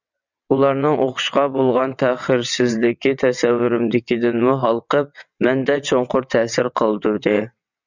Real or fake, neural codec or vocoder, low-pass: fake; vocoder, 22.05 kHz, 80 mel bands, WaveNeXt; 7.2 kHz